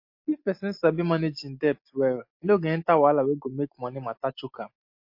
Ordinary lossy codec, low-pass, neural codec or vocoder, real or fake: MP3, 32 kbps; 5.4 kHz; none; real